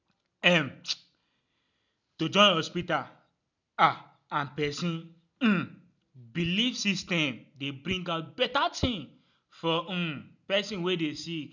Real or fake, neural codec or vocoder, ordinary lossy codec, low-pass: real; none; none; 7.2 kHz